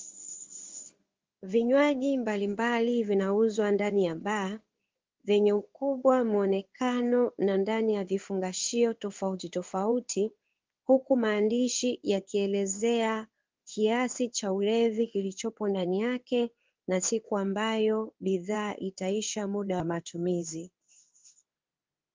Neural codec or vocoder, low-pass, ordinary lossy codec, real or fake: codec, 16 kHz in and 24 kHz out, 1 kbps, XY-Tokenizer; 7.2 kHz; Opus, 32 kbps; fake